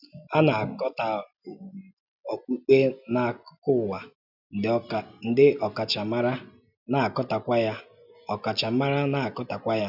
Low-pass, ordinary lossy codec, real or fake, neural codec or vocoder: 5.4 kHz; none; real; none